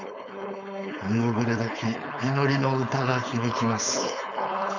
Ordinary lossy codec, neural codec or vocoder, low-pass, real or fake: none; codec, 16 kHz, 4.8 kbps, FACodec; 7.2 kHz; fake